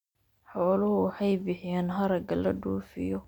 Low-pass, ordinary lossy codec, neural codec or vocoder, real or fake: 19.8 kHz; none; none; real